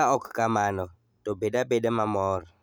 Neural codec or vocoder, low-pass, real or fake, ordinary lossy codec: none; none; real; none